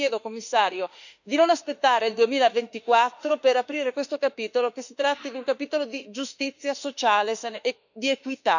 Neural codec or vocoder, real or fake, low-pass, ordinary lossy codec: autoencoder, 48 kHz, 32 numbers a frame, DAC-VAE, trained on Japanese speech; fake; 7.2 kHz; none